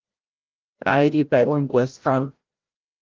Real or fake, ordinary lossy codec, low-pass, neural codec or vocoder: fake; Opus, 32 kbps; 7.2 kHz; codec, 16 kHz, 0.5 kbps, FreqCodec, larger model